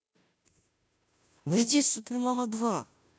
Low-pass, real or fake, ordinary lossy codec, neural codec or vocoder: none; fake; none; codec, 16 kHz, 0.5 kbps, FunCodec, trained on Chinese and English, 25 frames a second